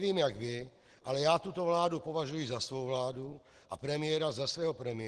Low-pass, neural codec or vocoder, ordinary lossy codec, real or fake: 9.9 kHz; none; Opus, 16 kbps; real